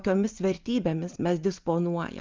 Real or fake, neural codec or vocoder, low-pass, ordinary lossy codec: real; none; 7.2 kHz; Opus, 24 kbps